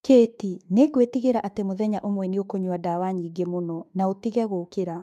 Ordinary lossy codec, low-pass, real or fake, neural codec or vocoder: MP3, 96 kbps; 14.4 kHz; fake; autoencoder, 48 kHz, 32 numbers a frame, DAC-VAE, trained on Japanese speech